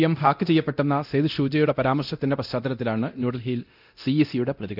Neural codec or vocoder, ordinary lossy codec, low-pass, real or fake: codec, 24 kHz, 0.9 kbps, DualCodec; none; 5.4 kHz; fake